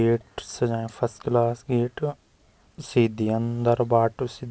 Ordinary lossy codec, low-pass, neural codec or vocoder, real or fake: none; none; none; real